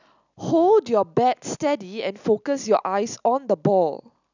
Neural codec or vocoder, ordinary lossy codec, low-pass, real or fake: none; none; 7.2 kHz; real